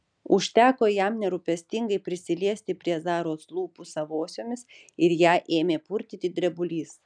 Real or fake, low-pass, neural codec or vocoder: real; 9.9 kHz; none